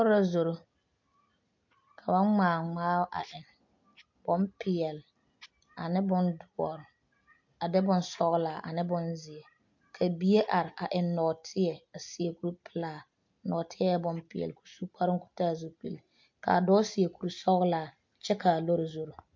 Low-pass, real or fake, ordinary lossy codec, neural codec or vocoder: 7.2 kHz; real; MP3, 48 kbps; none